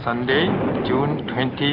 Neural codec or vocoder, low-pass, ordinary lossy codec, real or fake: none; 5.4 kHz; MP3, 48 kbps; real